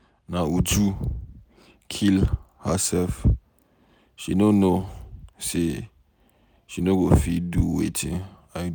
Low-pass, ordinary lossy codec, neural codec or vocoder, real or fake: none; none; none; real